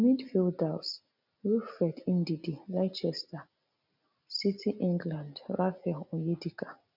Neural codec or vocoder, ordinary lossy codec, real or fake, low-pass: none; none; real; 5.4 kHz